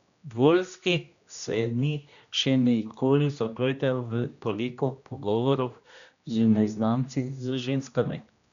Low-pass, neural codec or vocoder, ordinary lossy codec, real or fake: 7.2 kHz; codec, 16 kHz, 1 kbps, X-Codec, HuBERT features, trained on general audio; none; fake